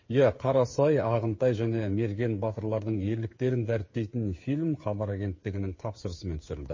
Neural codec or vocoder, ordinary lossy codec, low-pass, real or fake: codec, 16 kHz, 8 kbps, FreqCodec, smaller model; MP3, 32 kbps; 7.2 kHz; fake